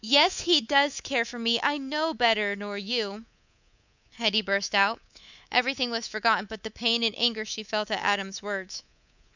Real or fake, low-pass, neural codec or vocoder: fake; 7.2 kHz; codec, 24 kHz, 3.1 kbps, DualCodec